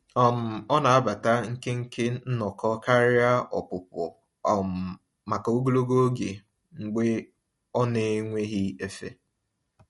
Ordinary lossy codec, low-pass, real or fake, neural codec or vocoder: MP3, 48 kbps; 14.4 kHz; real; none